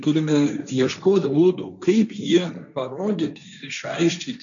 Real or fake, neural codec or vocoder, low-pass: fake; codec, 16 kHz, 1.1 kbps, Voila-Tokenizer; 7.2 kHz